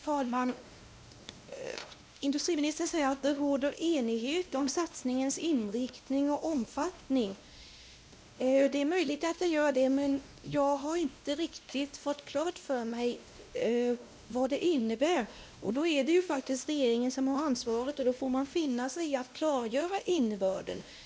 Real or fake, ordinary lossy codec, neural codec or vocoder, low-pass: fake; none; codec, 16 kHz, 1 kbps, X-Codec, WavLM features, trained on Multilingual LibriSpeech; none